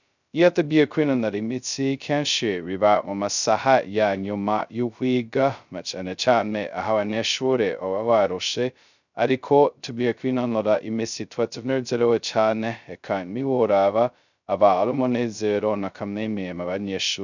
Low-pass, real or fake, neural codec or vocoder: 7.2 kHz; fake; codec, 16 kHz, 0.2 kbps, FocalCodec